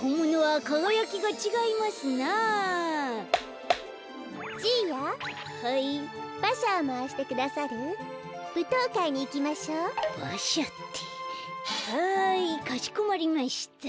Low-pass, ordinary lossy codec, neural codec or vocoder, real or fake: none; none; none; real